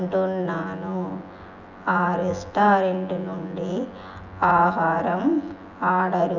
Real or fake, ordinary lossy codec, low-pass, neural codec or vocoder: fake; none; 7.2 kHz; vocoder, 24 kHz, 100 mel bands, Vocos